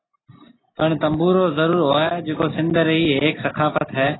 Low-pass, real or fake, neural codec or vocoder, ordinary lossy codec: 7.2 kHz; real; none; AAC, 16 kbps